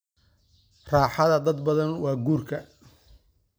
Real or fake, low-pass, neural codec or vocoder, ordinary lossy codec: real; none; none; none